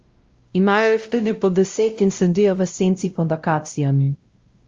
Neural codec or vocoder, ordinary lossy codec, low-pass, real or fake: codec, 16 kHz, 0.5 kbps, X-Codec, WavLM features, trained on Multilingual LibriSpeech; Opus, 32 kbps; 7.2 kHz; fake